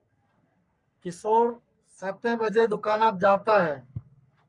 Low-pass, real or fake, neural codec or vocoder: 10.8 kHz; fake; codec, 44.1 kHz, 3.4 kbps, Pupu-Codec